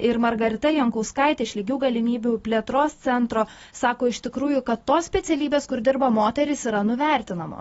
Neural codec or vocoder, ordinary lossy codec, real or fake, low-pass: none; AAC, 24 kbps; real; 19.8 kHz